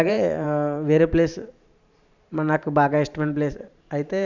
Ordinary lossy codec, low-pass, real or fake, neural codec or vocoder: none; 7.2 kHz; fake; vocoder, 44.1 kHz, 128 mel bands every 512 samples, BigVGAN v2